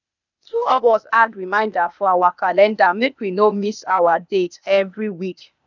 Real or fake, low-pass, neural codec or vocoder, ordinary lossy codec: fake; 7.2 kHz; codec, 16 kHz, 0.8 kbps, ZipCodec; none